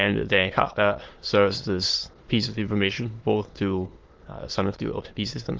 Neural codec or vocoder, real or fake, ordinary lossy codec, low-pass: autoencoder, 22.05 kHz, a latent of 192 numbers a frame, VITS, trained on many speakers; fake; Opus, 16 kbps; 7.2 kHz